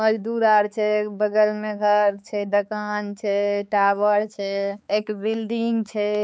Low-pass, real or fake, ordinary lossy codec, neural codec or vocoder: none; fake; none; codec, 16 kHz, 4 kbps, X-Codec, HuBERT features, trained on balanced general audio